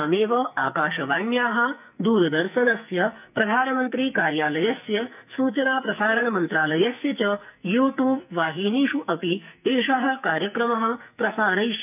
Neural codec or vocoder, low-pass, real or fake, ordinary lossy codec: codec, 44.1 kHz, 2.6 kbps, SNAC; 3.6 kHz; fake; none